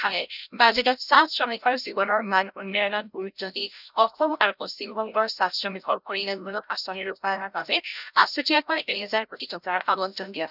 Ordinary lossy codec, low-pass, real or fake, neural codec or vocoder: none; 5.4 kHz; fake; codec, 16 kHz, 0.5 kbps, FreqCodec, larger model